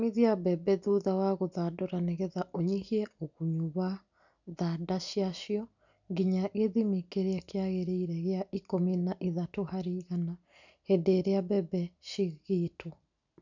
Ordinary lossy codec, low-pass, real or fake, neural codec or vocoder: none; 7.2 kHz; real; none